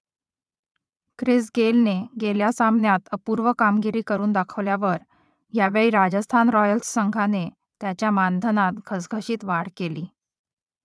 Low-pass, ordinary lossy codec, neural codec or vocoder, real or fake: none; none; vocoder, 22.05 kHz, 80 mel bands, Vocos; fake